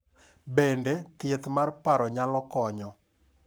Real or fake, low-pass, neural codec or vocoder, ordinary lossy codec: fake; none; codec, 44.1 kHz, 7.8 kbps, Pupu-Codec; none